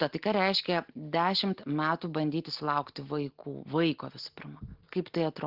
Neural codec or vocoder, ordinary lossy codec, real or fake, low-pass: none; Opus, 16 kbps; real; 5.4 kHz